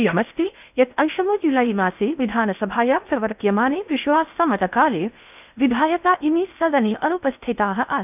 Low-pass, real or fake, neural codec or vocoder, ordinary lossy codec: 3.6 kHz; fake; codec, 16 kHz in and 24 kHz out, 0.6 kbps, FocalCodec, streaming, 2048 codes; none